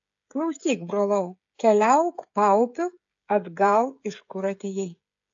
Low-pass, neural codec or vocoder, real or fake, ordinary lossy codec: 7.2 kHz; codec, 16 kHz, 16 kbps, FreqCodec, smaller model; fake; AAC, 48 kbps